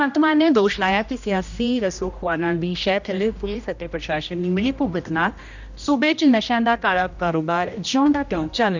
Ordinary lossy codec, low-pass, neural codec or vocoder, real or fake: none; 7.2 kHz; codec, 16 kHz, 1 kbps, X-Codec, HuBERT features, trained on general audio; fake